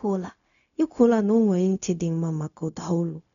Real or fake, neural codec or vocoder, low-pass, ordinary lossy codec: fake; codec, 16 kHz, 0.4 kbps, LongCat-Audio-Codec; 7.2 kHz; none